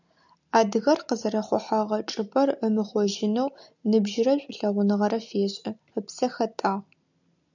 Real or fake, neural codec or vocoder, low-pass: real; none; 7.2 kHz